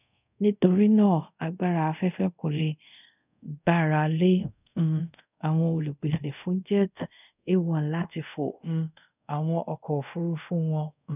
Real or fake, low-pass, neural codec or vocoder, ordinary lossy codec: fake; 3.6 kHz; codec, 24 kHz, 0.5 kbps, DualCodec; none